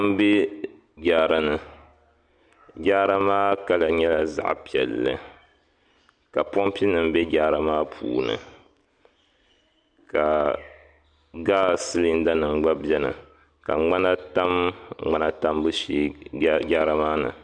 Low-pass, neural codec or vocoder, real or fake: 9.9 kHz; none; real